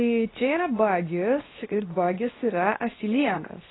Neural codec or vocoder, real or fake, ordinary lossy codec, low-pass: codec, 24 kHz, 0.9 kbps, WavTokenizer, medium speech release version 2; fake; AAC, 16 kbps; 7.2 kHz